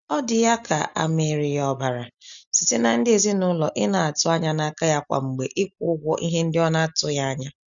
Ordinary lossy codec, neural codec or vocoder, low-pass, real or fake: none; none; 7.2 kHz; real